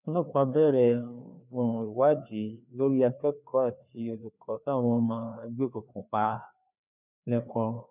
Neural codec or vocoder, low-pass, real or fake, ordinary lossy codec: codec, 16 kHz, 2 kbps, FreqCodec, larger model; 3.6 kHz; fake; none